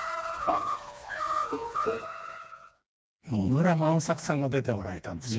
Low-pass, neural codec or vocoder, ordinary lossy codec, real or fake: none; codec, 16 kHz, 2 kbps, FreqCodec, smaller model; none; fake